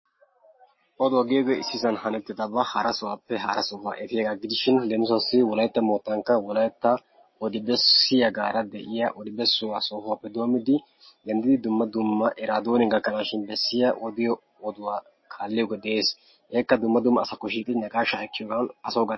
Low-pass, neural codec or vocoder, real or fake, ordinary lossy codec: 7.2 kHz; none; real; MP3, 24 kbps